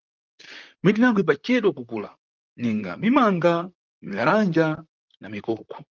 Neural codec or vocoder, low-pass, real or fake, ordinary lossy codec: vocoder, 44.1 kHz, 128 mel bands, Pupu-Vocoder; 7.2 kHz; fake; Opus, 32 kbps